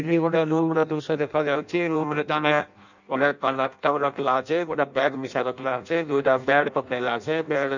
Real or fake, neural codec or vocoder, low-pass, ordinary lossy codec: fake; codec, 16 kHz in and 24 kHz out, 0.6 kbps, FireRedTTS-2 codec; 7.2 kHz; none